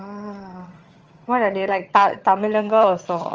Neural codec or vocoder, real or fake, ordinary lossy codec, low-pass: vocoder, 22.05 kHz, 80 mel bands, HiFi-GAN; fake; Opus, 24 kbps; 7.2 kHz